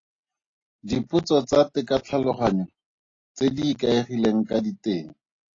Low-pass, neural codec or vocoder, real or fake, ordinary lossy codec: 7.2 kHz; none; real; AAC, 32 kbps